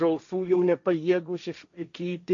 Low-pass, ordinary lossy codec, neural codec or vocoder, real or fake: 7.2 kHz; AAC, 64 kbps; codec, 16 kHz, 1.1 kbps, Voila-Tokenizer; fake